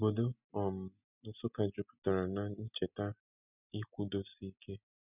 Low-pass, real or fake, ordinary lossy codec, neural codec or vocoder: 3.6 kHz; real; none; none